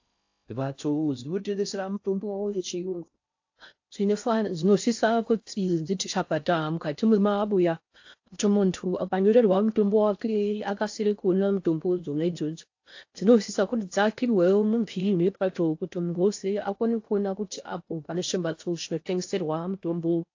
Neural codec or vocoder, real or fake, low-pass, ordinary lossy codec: codec, 16 kHz in and 24 kHz out, 0.6 kbps, FocalCodec, streaming, 4096 codes; fake; 7.2 kHz; AAC, 48 kbps